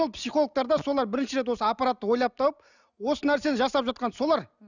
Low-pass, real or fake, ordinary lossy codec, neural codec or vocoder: 7.2 kHz; real; none; none